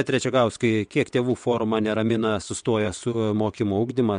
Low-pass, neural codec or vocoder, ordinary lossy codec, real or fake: 9.9 kHz; vocoder, 22.05 kHz, 80 mel bands, WaveNeXt; MP3, 64 kbps; fake